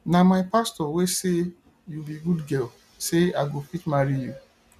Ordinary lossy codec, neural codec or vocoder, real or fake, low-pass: none; none; real; 14.4 kHz